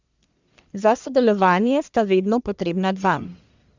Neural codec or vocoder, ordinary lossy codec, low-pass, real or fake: codec, 44.1 kHz, 1.7 kbps, Pupu-Codec; Opus, 64 kbps; 7.2 kHz; fake